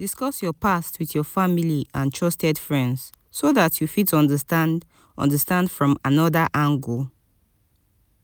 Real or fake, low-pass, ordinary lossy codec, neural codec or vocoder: real; none; none; none